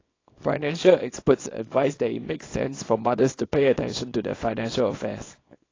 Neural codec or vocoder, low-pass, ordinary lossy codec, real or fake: codec, 24 kHz, 0.9 kbps, WavTokenizer, small release; 7.2 kHz; AAC, 32 kbps; fake